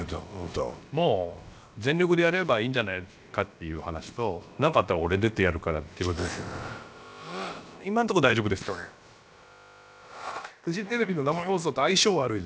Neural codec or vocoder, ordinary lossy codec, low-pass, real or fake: codec, 16 kHz, about 1 kbps, DyCAST, with the encoder's durations; none; none; fake